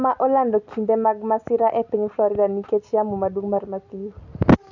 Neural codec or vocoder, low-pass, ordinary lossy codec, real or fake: none; 7.2 kHz; none; real